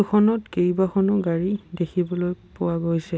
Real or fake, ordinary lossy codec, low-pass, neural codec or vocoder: real; none; none; none